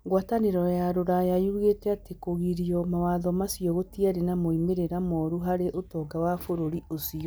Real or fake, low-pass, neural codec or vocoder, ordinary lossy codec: real; none; none; none